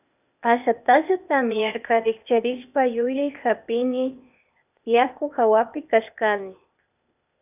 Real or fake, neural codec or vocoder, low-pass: fake; codec, 16 kHz, 0.8 kbps, ZipCodec; 3.6 kHz